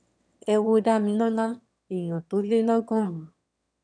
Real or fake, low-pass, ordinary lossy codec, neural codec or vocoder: fake; 9.9 kHz; none; autoencoder, 22.05 kHz, a latent of 192 numbers a frame, VITS, trained on one speaker